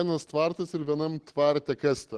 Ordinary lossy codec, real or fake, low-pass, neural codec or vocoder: Opus, 16 kbps; real; 10.8 kHz; none